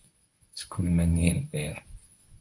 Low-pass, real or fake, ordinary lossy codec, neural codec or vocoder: 10.8 kHz; fake; AAC, 64 kbps; codec, 24 kHz, 0.9 kbps, WavTokenizer, medium speech release version 1